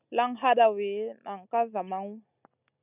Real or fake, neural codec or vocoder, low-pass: real; none; 3.6 kHz